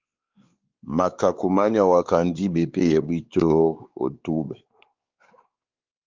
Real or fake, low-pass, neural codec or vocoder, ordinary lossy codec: fake; 7.2 kHz; codec, 16 kHz, 4 kbps, X-Codec, WavLM features, trained on Multilingual LibriSpeech; Opus, 32 kbps